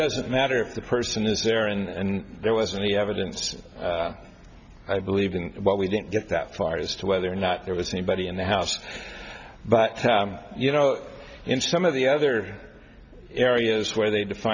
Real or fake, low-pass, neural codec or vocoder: real; 7.2 kHz; none